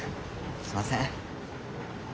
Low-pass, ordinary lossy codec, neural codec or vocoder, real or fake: none; none; none; real